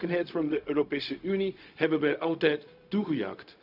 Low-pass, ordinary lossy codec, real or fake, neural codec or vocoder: 5.4 kHz; none; fake; codec, 16 kHz, 0.4 kbps, LongCat-Audio-Codec